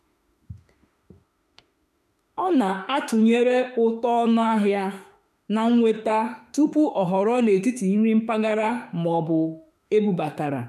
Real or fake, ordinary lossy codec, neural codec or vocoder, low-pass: fake; none; autoencoder, 48 kHz, 32 numbers a frame, DAC-VAE, trained on Japanese speech; 14.4 kHz